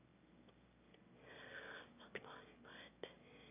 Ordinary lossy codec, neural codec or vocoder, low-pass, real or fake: none; autoencoder, 22.05 kHz, a latent of 192 numbers a frame, VITS, trained on one speaker; 3.6 kHz; fake